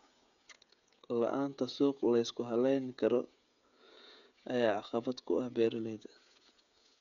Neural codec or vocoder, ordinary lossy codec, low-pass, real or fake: codec, 16 kHz, 16 kbps, FreqCodec, smaller model; none; 7.2 kHz; fake